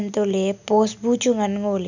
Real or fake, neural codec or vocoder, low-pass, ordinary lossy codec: real; none; 7.2 kHz; none